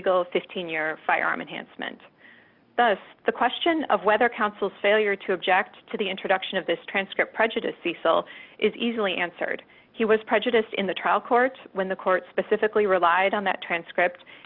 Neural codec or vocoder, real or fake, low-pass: none; real; 5.4 kHz